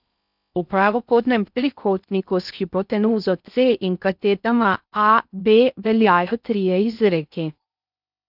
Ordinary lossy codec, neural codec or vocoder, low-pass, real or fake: none; codec, 16 kHz in and 24 kHz out, 0.6 kbps, FocalCodec, streaming, 4096 codes; 5.4 kHz; fake